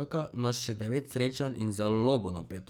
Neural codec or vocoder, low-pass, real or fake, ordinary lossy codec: codec, 44.1 kHz, 2.6 kbps, SNAC; none; fake; none